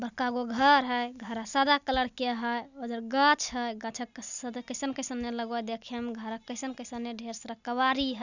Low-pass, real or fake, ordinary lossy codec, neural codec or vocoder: 7.2 kHz; real; none; none